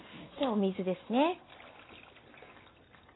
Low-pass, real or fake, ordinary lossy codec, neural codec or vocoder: 7.2 kHz; real; AAC, 16 kbps; none